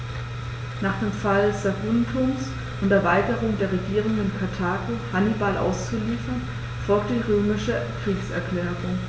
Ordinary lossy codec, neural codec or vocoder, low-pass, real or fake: none; none; none; real